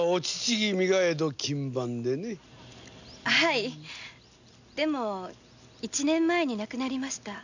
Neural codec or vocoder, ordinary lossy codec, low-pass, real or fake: none; none; 7.2 kHz; real